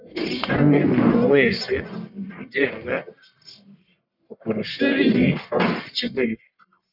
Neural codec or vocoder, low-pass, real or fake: codec, 44.1 kHz, 1.7 kbps, Pupu-Codec; 5.4 kHz; fake